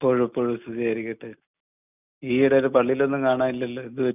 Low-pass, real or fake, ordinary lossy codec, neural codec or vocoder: 3.6 kHz; real; none; none